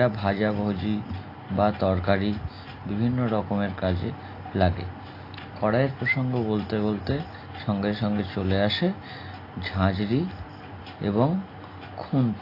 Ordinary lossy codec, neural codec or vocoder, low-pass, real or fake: AAC, 32 kbps; none; 5.4 kHz; real